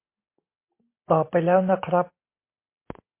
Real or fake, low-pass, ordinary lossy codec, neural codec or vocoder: real; 3.6 kHz; MP3, 24 kbps; none